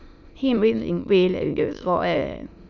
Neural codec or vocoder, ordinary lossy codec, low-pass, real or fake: autoencoder, 22.05 kHz, a latent of 192 numbers a frame, VITS, trained on many speakers; none; 7.2 kHz; fake